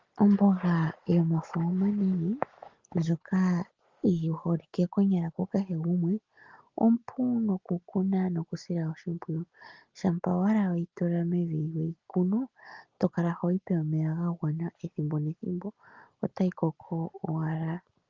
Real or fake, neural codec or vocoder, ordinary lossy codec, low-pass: real; none; Opus, 32 kbps; 7.2 kHz